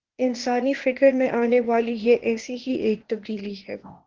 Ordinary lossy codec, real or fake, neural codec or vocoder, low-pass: Opus, 16 kbps; fake; codec, 16 kHz, 0.8 kbps, ZipCodec; 7.2 kHz